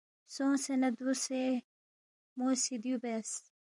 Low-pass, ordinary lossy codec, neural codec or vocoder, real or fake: 10.8 kHz; MP3, 96 kbps; vocoder, 44.1 kHz, 128 mel bands every 256 samples, BigVGAN v2; fake